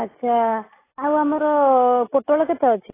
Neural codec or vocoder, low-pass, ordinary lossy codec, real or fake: none; 3.6 kHz; AAC, 16 kbps; real